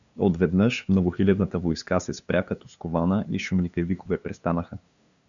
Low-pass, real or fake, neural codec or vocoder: 7.2 kHz; fake; codec, 16 kHz, 2 kbps, FunCodec, trained on LibriTTS, 25 frames a second